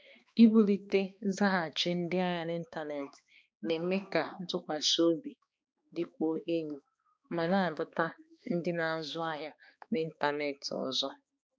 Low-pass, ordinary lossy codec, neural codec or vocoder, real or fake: none; none; codec, 16 kHz, 2 kbps, X-Codec, HuBERT features, trained on balanced general audio; fake